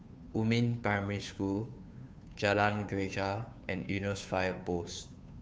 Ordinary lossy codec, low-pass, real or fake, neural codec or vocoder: none; none; fake; codec, 16 kHz, 2 kbps, FunCodec, trained on Chinese and English, 25 frames a second